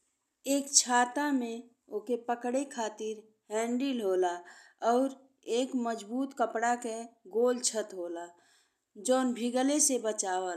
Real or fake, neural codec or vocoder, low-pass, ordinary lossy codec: real; none; 14.4 kHz; none